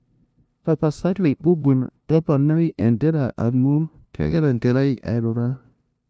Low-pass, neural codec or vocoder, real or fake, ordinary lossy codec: none; codec, 16 kHz, 0.5 kbps, FunCodec, trained on LibriTTS, 25 frames a second; fake; none